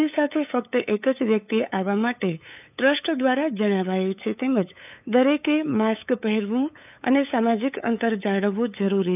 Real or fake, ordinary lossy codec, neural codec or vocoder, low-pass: fake; none; codec, 16 kHz, 8 kbps, FunCodec, trained on LibriTTS, 25 frames a second; 3.6 kHz